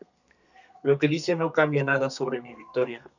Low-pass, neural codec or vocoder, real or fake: 7.2 kHz; codec, 32 kHz, 1.9 kbps, SNAC; fake